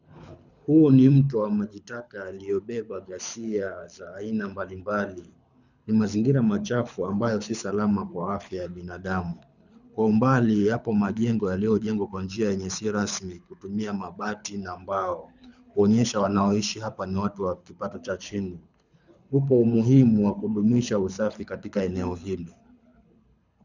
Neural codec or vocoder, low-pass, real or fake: codec, 24 kHz, 6 kbps, HILCodec; 7.2 kHz; fake